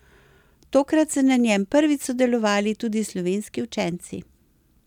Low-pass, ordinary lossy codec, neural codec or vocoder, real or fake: 19.8 kHz; none; none; real